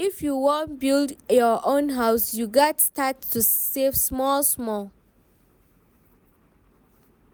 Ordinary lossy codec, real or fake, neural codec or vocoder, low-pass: none; real; none; none